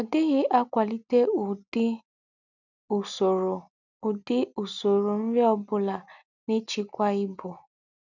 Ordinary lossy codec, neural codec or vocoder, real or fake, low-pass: none; none; real; 7.2 kHz